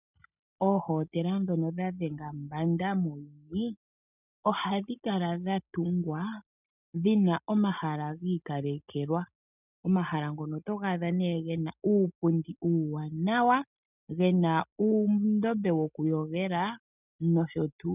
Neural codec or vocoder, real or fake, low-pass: none; real; 3.6 kHz